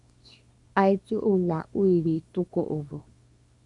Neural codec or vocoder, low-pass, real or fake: codec, 24 kHz, 0.9 kbps, WavTokenizer, small release; 10.8 kHz; fake